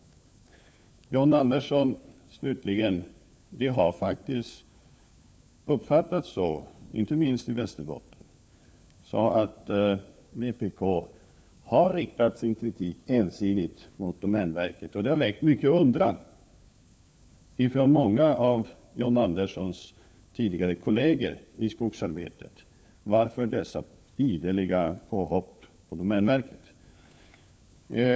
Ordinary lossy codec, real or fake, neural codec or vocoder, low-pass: none; fake; codec, 16 kHz, 4 kbps, FunCodec, trained on LibriTTS, 50 frames a second; none